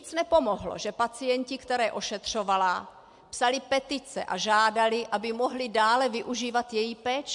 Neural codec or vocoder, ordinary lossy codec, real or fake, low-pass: none; MP3, 64 kbps; real; 10.8 kHz